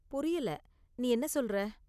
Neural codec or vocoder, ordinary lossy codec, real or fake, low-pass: none; none; real; 14.4 kHz